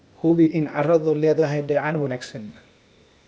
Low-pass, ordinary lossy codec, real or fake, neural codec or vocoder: none; none; fake; codec, 16 kHz, 0.8 kbps, ZipCodec